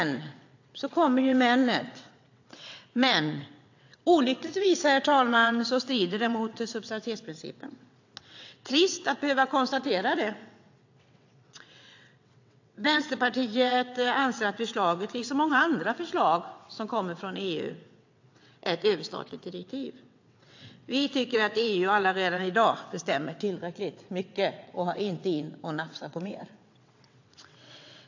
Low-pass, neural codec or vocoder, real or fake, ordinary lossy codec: 7.2 kHz; vocoder, 22.05 kHz, 80 mel bands, WaveNeXt; fake; AAC, 48 kbps